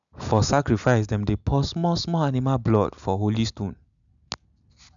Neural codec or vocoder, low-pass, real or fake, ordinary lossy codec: none; 7.2 kHz; real; none